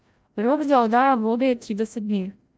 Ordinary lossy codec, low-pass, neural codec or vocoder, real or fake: none; none; codec, 16 kHz, 0.5 kbps, FreqCodec, larger model; fake